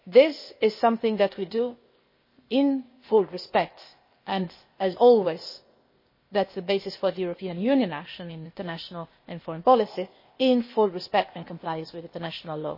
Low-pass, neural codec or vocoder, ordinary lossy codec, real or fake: 5.4 kHz; codec, 16 kHz, 0.8 kbps, ZipCodec; MP3, 24 kbps; fake